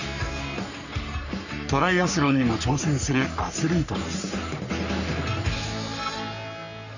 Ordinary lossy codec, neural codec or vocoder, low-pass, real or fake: none; codec, 44.1 kHz, 3.4 kbps, Pupu-Codec; 7.2 kHz; fake